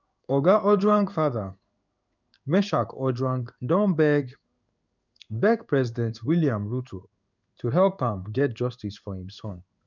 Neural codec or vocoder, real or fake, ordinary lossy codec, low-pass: codec, 16 kHz in and 24 kHz out, 1 kbps, XY-Tokenizer; fake; none; 7.2 kHz